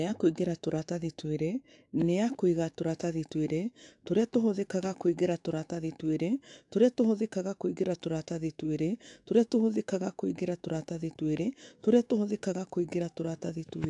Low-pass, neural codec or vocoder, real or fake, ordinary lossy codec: 10.8 kHz; autoencoder, 48 kHz, 128 numbers a frame, DAC-VAE, trained on Japanese speech; fake; AAC, 64 kbps